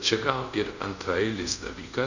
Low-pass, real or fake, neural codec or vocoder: 7.2 kHz; fake; codec, 24 kHz, 0.5 kbps, DualCodec